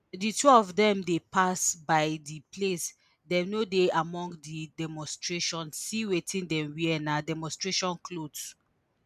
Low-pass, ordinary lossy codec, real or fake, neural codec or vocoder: 14.4 kHz; none; real; none